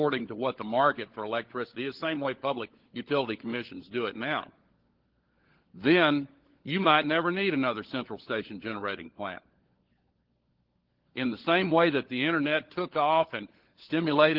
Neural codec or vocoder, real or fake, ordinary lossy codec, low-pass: codec, 16 kHz, 16 kbps, FunCodec, trained on LibriTTS, 50 frames a second; fake; Opus, 16 kbps; 5.4 kHz